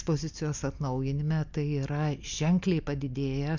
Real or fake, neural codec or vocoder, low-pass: real; none; 7.2 kHz